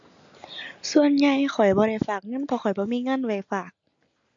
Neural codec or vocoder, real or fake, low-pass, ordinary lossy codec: none; real; 7.2 kHz; AAC, 48 kbps